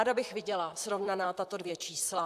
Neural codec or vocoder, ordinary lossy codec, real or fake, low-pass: vocoder, 44.1 kHz, 128 mel bands, Pupu-Vocoder; AAC, 96 kbps; fake; 14.4 kHz